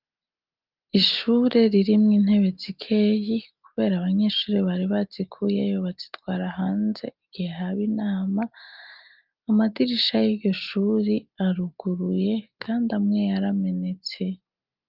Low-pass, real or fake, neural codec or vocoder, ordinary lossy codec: 5.4 kHz; real; none; Opus, 24 kbps